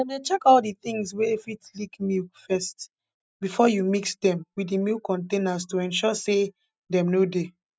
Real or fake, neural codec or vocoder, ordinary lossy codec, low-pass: real; none; none; none